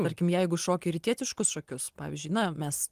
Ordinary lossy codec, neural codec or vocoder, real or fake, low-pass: Opus, 24 kbps; none; real; 14.4 kHz